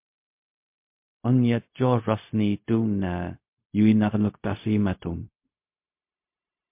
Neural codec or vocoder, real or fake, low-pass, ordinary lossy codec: codec, 16 kHz, 0.4 kbps, LongCat-Audio-Codec; fake; 3.6 kHz; MP3, 32 kbps